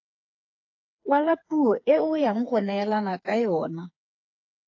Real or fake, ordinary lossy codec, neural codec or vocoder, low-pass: fake; AAC, 48 kbps; codec, 16 kHz, 4 kbps, FreqCodec, smaller model; 7.2 kHz